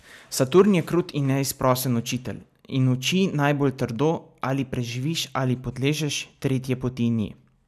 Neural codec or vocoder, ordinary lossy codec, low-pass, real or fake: none; none; 14.4 kHz; real